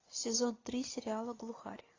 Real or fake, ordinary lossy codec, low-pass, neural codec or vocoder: real; AAC, 32 kbps; 7.2 kHz; none